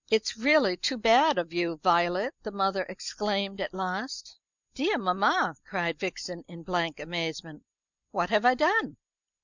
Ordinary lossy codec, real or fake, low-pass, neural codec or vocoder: Opus, 64 kbps; real; 7.2 kHz; none